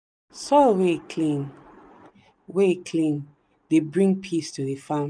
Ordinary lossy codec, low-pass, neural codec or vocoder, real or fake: none; 9.9 kHz; none; real